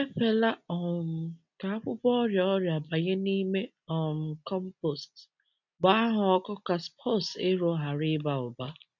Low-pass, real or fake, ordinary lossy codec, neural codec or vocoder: 7.2 kHz; real; none; none